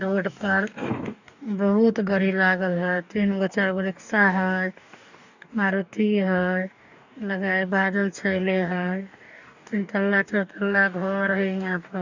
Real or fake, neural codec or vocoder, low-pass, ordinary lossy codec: fake; codec, 44.1 kHz, 2.6 kbps, DAC; 7.2 kHz; none